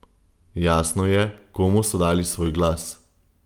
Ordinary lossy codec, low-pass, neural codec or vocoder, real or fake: Opus, 32 kbps; 19.8 kHz; none; real